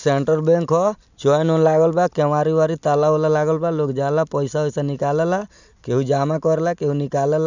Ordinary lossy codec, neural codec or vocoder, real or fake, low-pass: none; none; real; 7.2 kHz